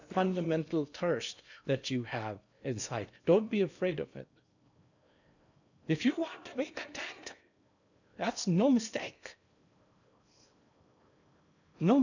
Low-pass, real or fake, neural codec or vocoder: 7.2 kHz; fake; codec, 16 kHz in and 24 kHz out, 0.8 kbps, FocalCodec, streaming, 65536 codes